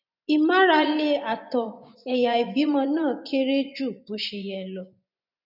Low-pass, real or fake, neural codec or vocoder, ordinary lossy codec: 5.4 kHz; fake; vocoder, 24 kHz, 100 mel bands, Vocos; none